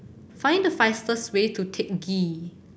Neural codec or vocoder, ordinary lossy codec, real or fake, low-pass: none; none; real; none